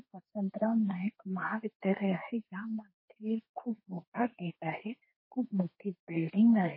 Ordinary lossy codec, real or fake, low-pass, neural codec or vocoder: MP3, 24 kbps; fake; 5.4 kHz; codec, 32 kHz, 1.9 kbps, SNAC